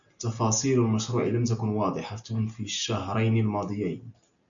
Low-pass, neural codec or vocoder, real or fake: 7.2 kHz; none; real